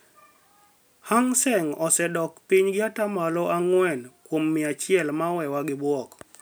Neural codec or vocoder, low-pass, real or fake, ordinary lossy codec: none; none; real; none